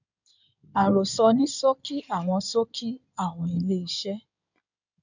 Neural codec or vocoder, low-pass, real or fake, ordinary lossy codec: codec, 16 kHz in and 24 kHz out, 2.2 kbps, FireRedTTS-2 codec; 7.2 kHz; fake; none